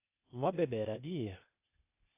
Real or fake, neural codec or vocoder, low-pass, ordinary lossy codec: fake; codec, 16 kHz, 0.8 kbps, ZipCodec; 3.6 kHz; AAC, 24 kbps